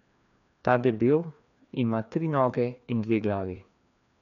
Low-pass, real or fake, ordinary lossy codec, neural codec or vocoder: 7.2 kHz; fake; none; codec, 16 kHz, 2 kbps, FreqCodec, larger model